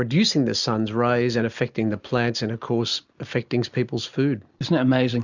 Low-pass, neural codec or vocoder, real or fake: 7.2 kHz; none; real